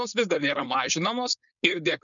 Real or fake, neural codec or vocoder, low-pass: fake; codec, 16 kHz, 4.8 kbps, FACodec; 7.2 kHz